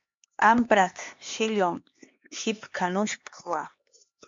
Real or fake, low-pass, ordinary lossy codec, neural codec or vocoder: fake; 7.2 kHz; MP3, 48 kbps; codec, 16 kHz, 2 kbps, X-Codec, HuBERT features, trained on LibriSpeech